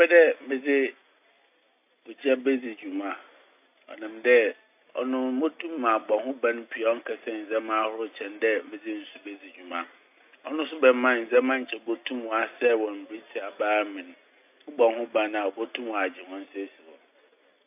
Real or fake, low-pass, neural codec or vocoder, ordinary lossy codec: real; 3.6 kHz; none; AAC, 32 kbps